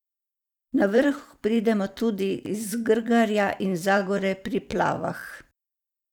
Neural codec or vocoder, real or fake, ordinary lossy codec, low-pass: vocoder, 44.1 kHz, 128 mel bands every 512 samples, BigVGAN v2; fake; none; 19.8 kHz